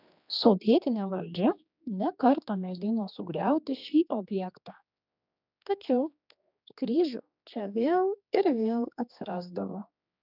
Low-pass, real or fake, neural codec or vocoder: 5.4 kHz; fake; codec, 16 kHz, 2 kbps, X-Codec, HuBERT features, trained on general audio